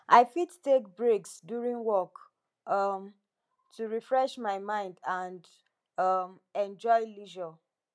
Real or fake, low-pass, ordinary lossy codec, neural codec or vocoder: real; none; none; none